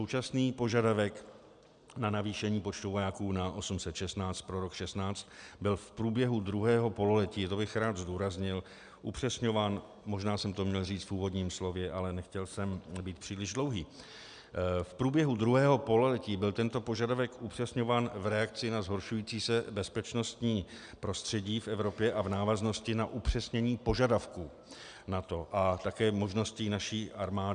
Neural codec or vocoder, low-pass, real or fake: none; 9.9 kHz; real